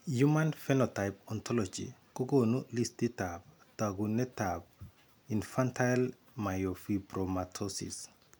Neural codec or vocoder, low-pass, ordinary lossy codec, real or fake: none; none; none; real